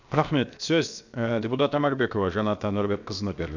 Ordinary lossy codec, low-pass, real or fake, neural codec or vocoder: none; 7.2 kHz; fake; codec, 16 kHz, 0.7 kbps, FocalCodec